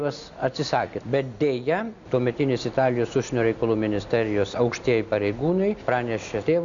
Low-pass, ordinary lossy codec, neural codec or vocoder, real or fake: 7.2 kHz; Opus, 64 kbps; none; real